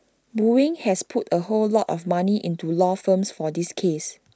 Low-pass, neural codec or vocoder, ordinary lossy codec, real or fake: none; none; none; real